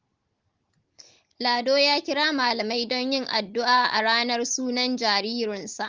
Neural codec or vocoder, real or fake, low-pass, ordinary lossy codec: none; real; 7.2 kHz; Opus, 16 kbps